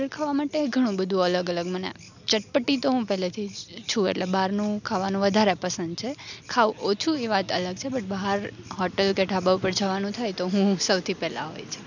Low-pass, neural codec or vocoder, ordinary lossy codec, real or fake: 7.2 kHz; none; none; real